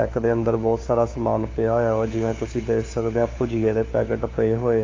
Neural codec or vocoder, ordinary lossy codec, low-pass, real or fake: codec, 16 kHz, 4 kbps, FunCodec, trained on LibriTTS, 50 frames a second; AAC, 32 kbps; 7.2 kHz; fake